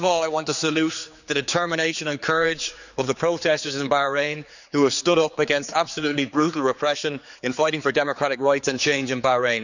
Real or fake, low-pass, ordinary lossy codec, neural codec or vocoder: fake; 7.2 kHz; none; codec, 16 kHz, 4 kbps, X-Codec, HuBERT features, trained on general audio